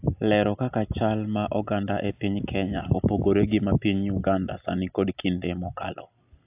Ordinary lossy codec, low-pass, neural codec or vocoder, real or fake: AAC, 32 kbps; 3.6 kHz; none; real